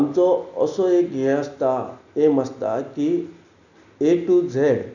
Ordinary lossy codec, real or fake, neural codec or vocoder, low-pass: none; real; none; 7.2 kHz